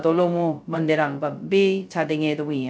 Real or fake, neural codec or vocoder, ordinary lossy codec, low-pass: fake; codec, 16 kHz, 0.2 kbps, FocalCodec; none; none